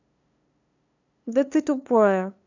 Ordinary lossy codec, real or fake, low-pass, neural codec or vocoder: none; fake; 7.2 kHz; codec, 16 kHz, 2 kbps, FunCodec, trained on LibriTTS, 25 frames a second